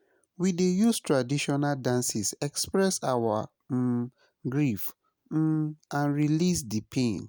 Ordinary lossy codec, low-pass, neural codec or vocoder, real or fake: none; none; none; real